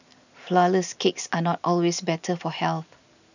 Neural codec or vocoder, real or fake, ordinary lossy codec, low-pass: none; real; none; 7.2 kHz